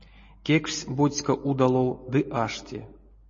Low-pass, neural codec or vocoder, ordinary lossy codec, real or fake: 7.2 kHz; none; MP3, 32 kbps; real